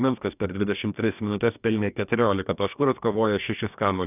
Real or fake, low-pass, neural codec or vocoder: fake; 3.6 kHz; codec, 44.1 kHz, 2.6 kbps, DAC